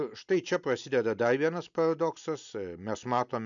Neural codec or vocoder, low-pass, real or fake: none; 7.2 kHz; real